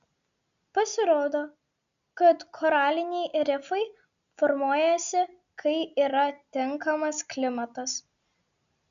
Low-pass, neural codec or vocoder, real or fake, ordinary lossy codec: 7.2 kHz; none; real; MP3, 96 kbps